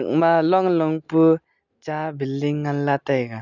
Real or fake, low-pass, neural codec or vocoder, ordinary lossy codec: real; 7.2 kHz; none; none